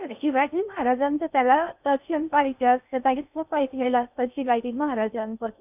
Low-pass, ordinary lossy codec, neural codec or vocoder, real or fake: 3.6 kHz; none; codec, 16 kHz in and 24 kHz out, 0.6 kbps, FocalCodec, streaming, 4096 codes; fake